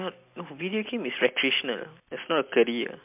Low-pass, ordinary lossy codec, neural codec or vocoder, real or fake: 3.6 kHz; MP3, 32 kbps; none; real